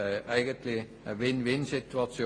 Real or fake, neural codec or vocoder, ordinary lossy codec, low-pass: real; none; AAC, 32 kbps; 9.9 kHz